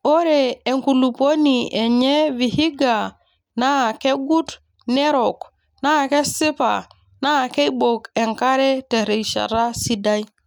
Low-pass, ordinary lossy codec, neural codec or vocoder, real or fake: 19.8 kHz; none; none; real